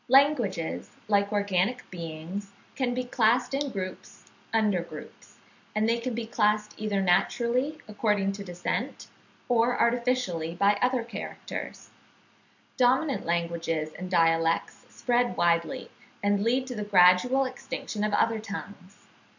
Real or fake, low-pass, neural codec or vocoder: real; 7.2 kHz; none